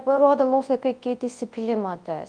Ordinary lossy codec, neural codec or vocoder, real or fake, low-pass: Opus, 24 kbps; codec, 24 kHz, 0.9 kbps, WavTokenizer, large speech release; fake; 9.9 kHz